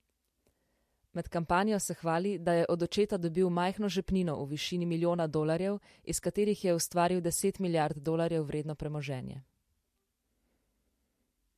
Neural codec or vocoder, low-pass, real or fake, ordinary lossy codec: none; 14.4 kHz; real; MP3, 64 kbps